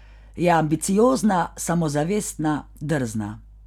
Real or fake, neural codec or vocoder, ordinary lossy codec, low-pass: real; none; none; 19.8 kHz